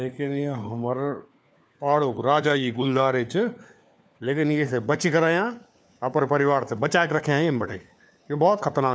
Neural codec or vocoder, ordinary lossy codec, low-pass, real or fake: codec, 16 kHz, 4 kbps, FunCodec, trained on LibriTTS, 50 frames a second; none; none; fake